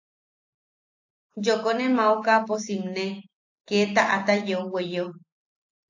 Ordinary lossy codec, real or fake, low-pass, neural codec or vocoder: AAC, 48 kbps; real; 7.2 kHz; none